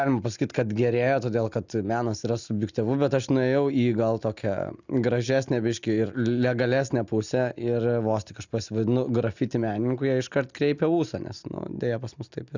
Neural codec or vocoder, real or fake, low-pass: none; real; 7.2 kHz